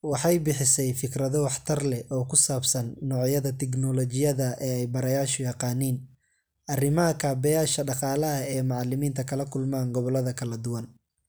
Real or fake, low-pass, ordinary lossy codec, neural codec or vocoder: real; none; none; none